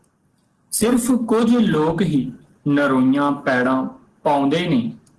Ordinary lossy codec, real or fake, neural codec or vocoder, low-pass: Opus, 16 kbps; real; none; 10.8 kHz